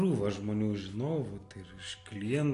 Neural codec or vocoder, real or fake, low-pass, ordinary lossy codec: none; real; 10.8 kHz; AAC, 48 kbps